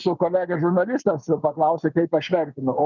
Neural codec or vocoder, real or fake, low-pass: none; real; 7.2 kHz